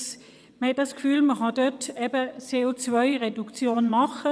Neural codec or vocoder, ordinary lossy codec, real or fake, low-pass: vocoder, 22.05 kHz, 80 mel bands, WaveNeXt; none; fake; none